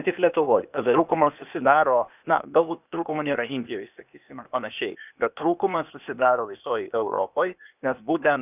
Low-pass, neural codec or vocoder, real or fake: 3.6 kHz; codec, 16 kHz, 0.8 kbps, ZipCodec; fake